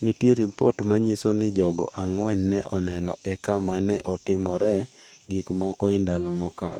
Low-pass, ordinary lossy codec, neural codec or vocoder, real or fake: 19.8 kHz; none; codec, 44.1 kHz, 2.6 kbps, DAC; fake